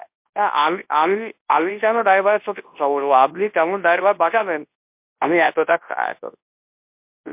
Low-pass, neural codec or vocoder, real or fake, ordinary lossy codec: 3.6 kHz; codec, 24 kHz, 0.9 kbps, WavTokenizer, large speech release; fake; MP3, 32 kbps